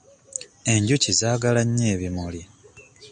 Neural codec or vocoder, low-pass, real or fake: none; 9.9 kHz; real